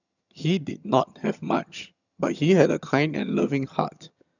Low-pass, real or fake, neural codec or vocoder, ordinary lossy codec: 7.2 kHz; fake; vocoder, 22.05 kHz, 80 mel bands, HiFi-GAN; none